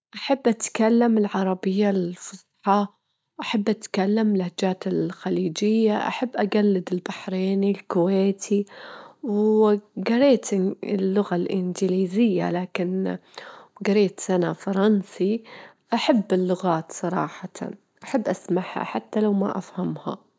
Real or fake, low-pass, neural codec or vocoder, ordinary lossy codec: real; none; none; none